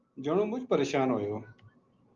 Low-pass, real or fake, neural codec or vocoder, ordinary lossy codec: 7.2 kHz; real; none; Opus, 32 kbps